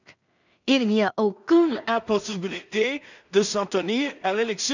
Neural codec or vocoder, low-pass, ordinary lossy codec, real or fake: codec, 16 kHz in and 24 kHz out, 0.4 kbps, LongCat-Audio-Codec, two codebook decoder; 7.2 kHz; none; fake